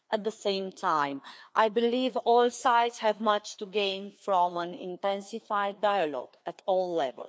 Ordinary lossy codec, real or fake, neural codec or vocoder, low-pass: none; fake; codec, 16 kHz, 2 kbps, FreqCodec, larger model; none